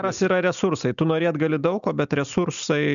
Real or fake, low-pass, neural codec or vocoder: real; 7.2 kHz; none